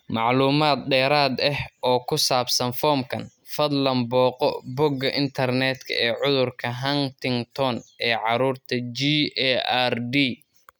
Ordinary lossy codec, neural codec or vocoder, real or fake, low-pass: none; none; real; none